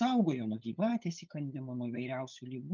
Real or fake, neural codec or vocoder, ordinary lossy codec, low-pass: fake; codec, 16 kHz, 8 kbps, FunCodec, trained on LibriTTS, 25 frames a second; Opus, 24 kbps; 7.2 kHz